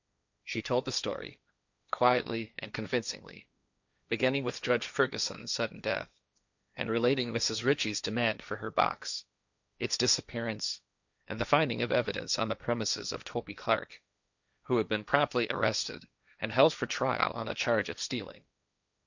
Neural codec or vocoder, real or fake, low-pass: codec, 16 kHz, 1.1 kbps, Voila-Tokenizer; fake; 7.2 kHz